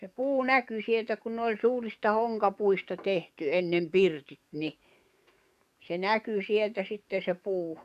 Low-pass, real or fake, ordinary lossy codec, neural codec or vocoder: 14.4 kHz; fake; none; codec, 44.1 kHz, 7.8 kbps, DAC